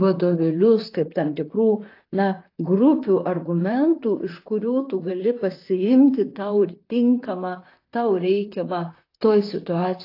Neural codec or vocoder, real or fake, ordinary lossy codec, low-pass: vocoder, 44.1 kHz, 128 mel bands, Pupu-Vocoder; fake; AAC, 32 kbps; 5.4 kHz